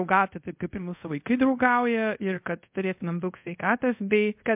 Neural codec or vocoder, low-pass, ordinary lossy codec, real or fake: codec, 24 kHz, 0.5 kbps, DualCodec; 3.6 kHz; MP3, 32 kbps; fake